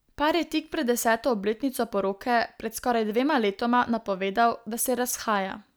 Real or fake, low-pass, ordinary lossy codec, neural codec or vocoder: real; none; none; none